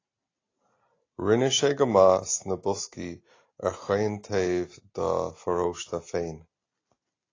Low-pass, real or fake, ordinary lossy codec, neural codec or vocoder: 7.2 kHz; real; AAC, 32 kbps; none